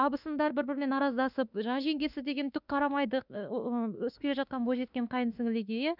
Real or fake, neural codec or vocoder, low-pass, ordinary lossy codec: fake; autoencoder, 48 kHz, 32 numbers a frame, DAC-VAE, trained on Japanese speech; 5.4 kHz; none